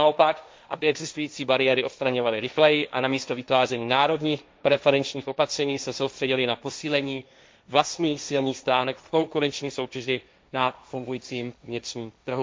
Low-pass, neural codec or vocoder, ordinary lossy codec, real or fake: none; codec, 16 kHz, 1.1 kbps, Voila-Tokenizer; none; fake